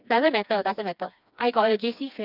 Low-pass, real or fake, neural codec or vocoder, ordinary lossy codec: 5.4 kHz; fake; codec, 16 kHz, 2 kbps, FreqCodec, smaller model; none